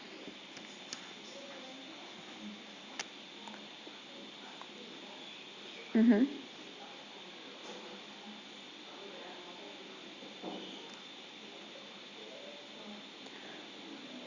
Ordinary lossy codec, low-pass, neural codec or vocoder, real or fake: Opus, 64 kbps; 7.2 kHz; none; real